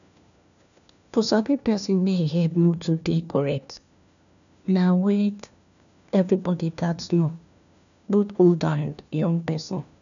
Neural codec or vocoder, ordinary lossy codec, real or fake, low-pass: codec, 16 kHz, 1 kbps, FunCodec, trained on LibriTTS, 50 frames a second; none; fake; 7.2 kHz